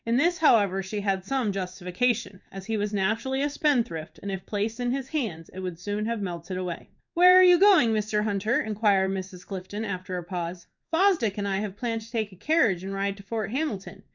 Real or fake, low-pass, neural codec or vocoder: fake; 7.2 kHz; codec, 16 kHz in and 24 kHz out, 1 kbps, XY-Tokenizer